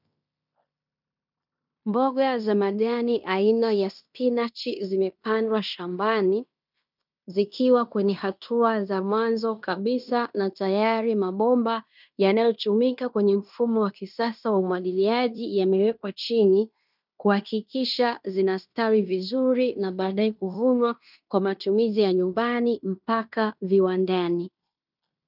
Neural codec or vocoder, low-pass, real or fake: codec, 16 kHz in and 24 kHz out, 0.9 kbps, LongCat-Audio-Codec, fine tuned four codebook decoder; 5.4 kHz; fake